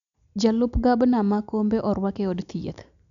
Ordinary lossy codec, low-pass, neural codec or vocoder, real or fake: none; 7.2 kHz; none; real